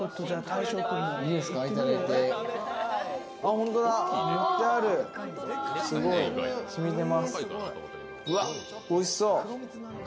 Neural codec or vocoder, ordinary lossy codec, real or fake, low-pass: none; none; real; none